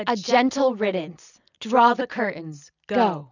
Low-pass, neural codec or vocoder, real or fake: 7.2 kHz; none; real